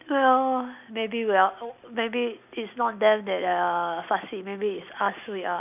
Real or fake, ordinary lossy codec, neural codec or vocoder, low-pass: real; none; none; 3.6 kHz